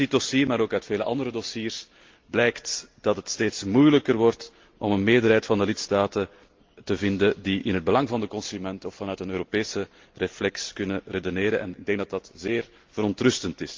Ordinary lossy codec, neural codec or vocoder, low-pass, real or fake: Opus, 32 kbps; none; 7.2 kHz; real